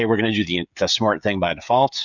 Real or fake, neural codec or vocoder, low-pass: fake; codec, 16 kHz, 16 kbps, FreqCodec, smaller model; 7.2 kHz